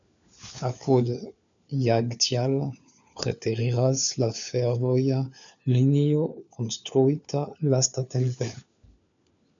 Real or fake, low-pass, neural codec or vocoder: fake; 7.2 kHz; codec, 16 kHz, 4 kbps, FunCodec, trained on LibriTTS, 50 frames a second